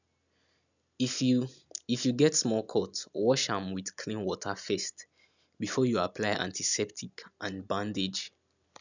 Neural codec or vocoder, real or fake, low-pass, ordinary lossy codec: none; real; 7.2 kHz; none